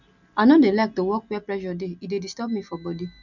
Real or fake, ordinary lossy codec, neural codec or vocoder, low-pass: real; none; none; 7.2 kHz